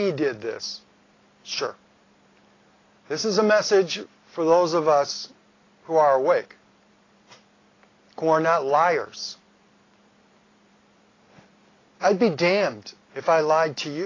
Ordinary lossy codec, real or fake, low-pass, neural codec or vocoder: AAC, 32 kbps; real; 7.2 kHz; none